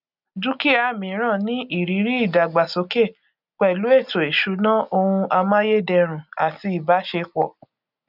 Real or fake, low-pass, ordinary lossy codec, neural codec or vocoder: real; 5.4 kHz; none; none